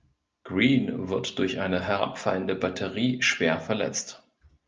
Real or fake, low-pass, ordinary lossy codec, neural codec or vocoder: real; 7.2 kHz; Opus, 24 kbps; none